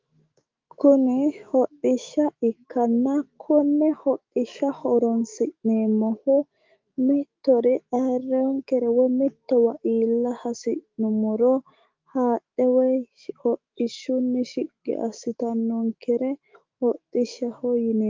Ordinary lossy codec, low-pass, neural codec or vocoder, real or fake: Opus, 32 kbps; 7.2 kHz; autoencoder, 48 kHz, 128 numbers a frame, DAC-VAE, trained on Japanese speech; fake